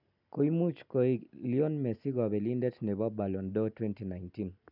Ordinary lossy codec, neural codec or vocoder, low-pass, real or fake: none; none; 5.4 kHz; real